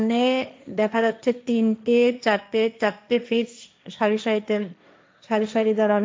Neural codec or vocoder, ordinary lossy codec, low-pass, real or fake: codec, 16 kHz, 1.1 kbps, Voila-Tokenizer; none; none; fake